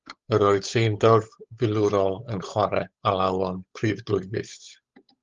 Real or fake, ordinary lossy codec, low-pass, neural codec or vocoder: fake; Opus, 32 kbps; 7.2 kHz; codec, 16 kHz, 8 kbps, FunCodec, trained on Chinese and English, 25 frames a second